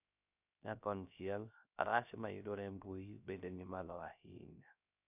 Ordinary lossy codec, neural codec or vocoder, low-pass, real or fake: none; codec, 16 kHz, 0.3 kbps, FocalCodec; 3.6 kHz; fake